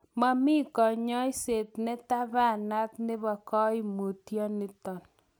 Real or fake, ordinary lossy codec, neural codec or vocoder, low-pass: real; none; none; none